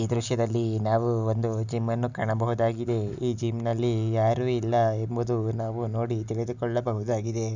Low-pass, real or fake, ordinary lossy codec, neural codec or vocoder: 7.2 kHz; real; none; none